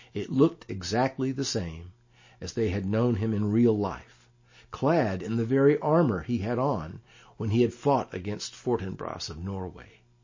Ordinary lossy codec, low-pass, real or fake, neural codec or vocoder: MP3, 32 kbps; 7.2 kHz; real; none